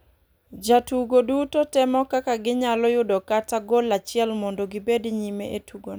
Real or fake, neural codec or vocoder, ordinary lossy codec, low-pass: real; none; none; none